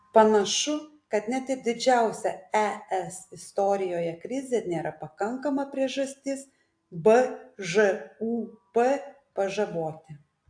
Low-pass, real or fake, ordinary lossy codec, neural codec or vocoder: 9.9 kHz; real; AAC, 64 kbps; none